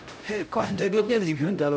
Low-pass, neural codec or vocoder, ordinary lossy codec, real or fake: none; codec, 16 kHz, 0.5 kbps, X-Codec, HuBERT features, trained on LibriSpeech; none; fake